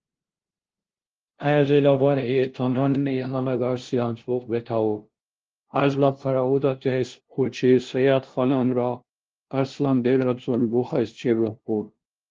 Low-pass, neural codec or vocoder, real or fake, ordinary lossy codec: 7.2 kHz; codec, 16 kHz, 0.5 kbps, FunCodec, trained on LibriTTS, 25 frames a second; fake; Opus, 16 kbps